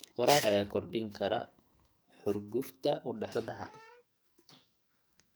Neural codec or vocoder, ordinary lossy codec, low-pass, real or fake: codec, 44.1 kHz, 2.6 kbps, SNAC; none; none; fake